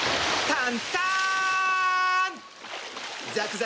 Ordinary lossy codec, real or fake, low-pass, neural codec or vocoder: none; real; none; none